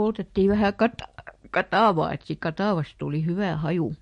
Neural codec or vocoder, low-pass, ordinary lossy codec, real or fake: none; 10.8 kHz; MP3, 48 kbps; real